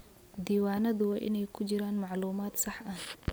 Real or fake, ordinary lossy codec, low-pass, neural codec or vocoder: real; none; none; none